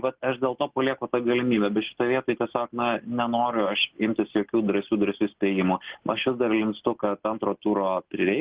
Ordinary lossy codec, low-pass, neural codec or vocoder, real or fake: Opus, 16 kbps; 3.6 kHz; none; real